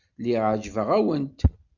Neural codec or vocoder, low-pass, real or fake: none; 7.2 kHz; real